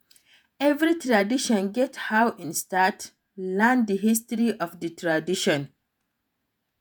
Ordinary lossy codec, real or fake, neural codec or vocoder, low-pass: none; fake; vocoder, 48 kHz, 128 mel bands, Vocos; none